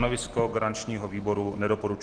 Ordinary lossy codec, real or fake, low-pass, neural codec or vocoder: Opus, 16 kbps; real; 9.9 kHz; none